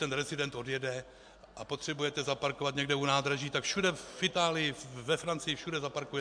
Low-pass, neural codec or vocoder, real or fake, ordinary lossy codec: 9.9 kHz; none; real; MP3, 64 kbps